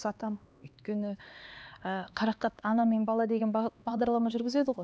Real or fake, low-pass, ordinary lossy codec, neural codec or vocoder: fake; none; none; codec, 16 kHz, 2 kbps, X-Codec, HuBERT features, trained on LibriSpeech